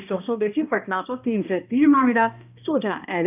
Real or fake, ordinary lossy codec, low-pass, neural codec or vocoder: fake; none; 3.6 kHz; codec, 16 kHz, 1 kbps, X-Codec, HuBERT features, trained on balanced general audio